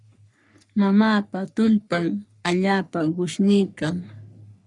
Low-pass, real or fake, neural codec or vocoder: 10.8 kHz; fake; codec, 44.1 kHz, 3.4 kbps, Pupu-Codec